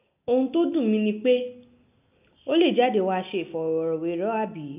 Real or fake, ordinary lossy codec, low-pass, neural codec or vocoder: real; none; 3.6 kHz; none